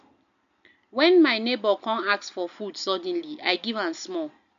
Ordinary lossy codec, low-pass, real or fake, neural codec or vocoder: none; 7.2 kHz; real; none